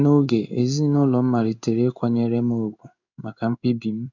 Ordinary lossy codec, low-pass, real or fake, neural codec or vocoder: none; 7.2 kHz; fake; codec, 16 kHz in and 24 kHz out, 1 kbps, XY-Tokenizer